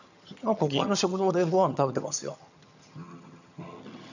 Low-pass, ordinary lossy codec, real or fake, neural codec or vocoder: 7.2 kHz; none; fake; vocoder, 22.05 kHz, 80 mel bands, HiFi-GAN